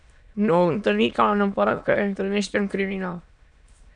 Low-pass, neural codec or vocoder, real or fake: 9.9 kHz; autoencoder, 22.05 kHz, a latent of 192 numbers a frame, VITS, trained on many speakers; fake